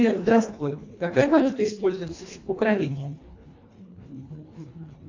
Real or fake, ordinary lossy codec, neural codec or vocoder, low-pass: fake; AAC, 48 kbps; codec, 24 kHz, 1.5 kbps, HILCodec; 7.2 kHz